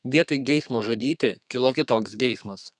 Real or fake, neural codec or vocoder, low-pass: fake; codec, 32 kHz, 1.9 kbps, SNAC; 10.8 kHz